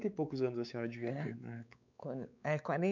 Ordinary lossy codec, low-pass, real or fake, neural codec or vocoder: none; 7.2 kHz; fake; codec, 16 kHz, 4 kbps, X-Codec, WavLM features, trained on Multilingual LibriSpeech